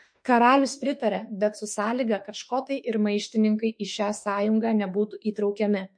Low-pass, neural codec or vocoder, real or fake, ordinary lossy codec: 9.9 kHz; autoencoder, 48 kHz, 32 numbers a frame, DAC-VAE, trained on Japanese speech; fake; MP3, 48 kbps